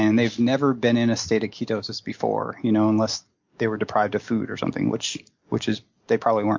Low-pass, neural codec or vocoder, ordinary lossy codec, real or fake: 7.2 kHz; none; MP3, 64 kbps; real